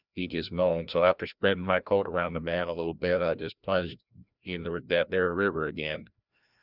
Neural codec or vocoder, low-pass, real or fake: codec, 16 kHz, 1 kbps, FreqCodec, larger model; 5.4 kHz; fake